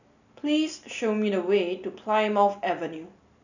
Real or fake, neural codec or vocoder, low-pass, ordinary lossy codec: real; none; 7.2 kHz; none